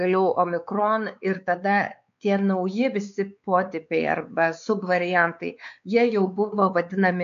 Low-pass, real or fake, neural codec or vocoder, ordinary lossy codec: 7.2 kHz; fake; codec, 16 kHz, 4 kbps, X-Codec, WavLM features, trained on Multilingual LibriSpeech; MP3, 64 kbps